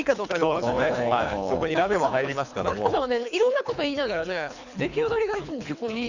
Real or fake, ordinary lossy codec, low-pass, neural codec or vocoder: fake; none; 7.2 kHz; codec, 24 kHz, 3 kbps, HILCodec